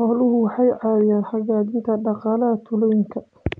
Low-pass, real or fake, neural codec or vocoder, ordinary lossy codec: 9.9 kHz; real; none; MP3, 64 kbps